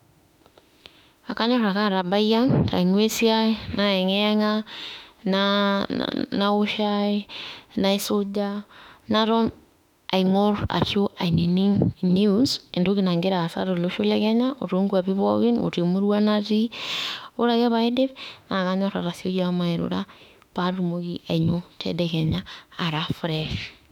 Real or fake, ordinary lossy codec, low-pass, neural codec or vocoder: fake; none; 19.8 kHz; autoencoder, 48 kHz, 32 numbers a frame, DAC-VAE, trained on Japanese speech